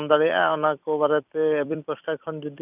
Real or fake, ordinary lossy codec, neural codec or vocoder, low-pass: fake; none; vocoder, 44.1 kHz, 128 mel bands every 256 samples, BigVGAN v2; 3.6 kHz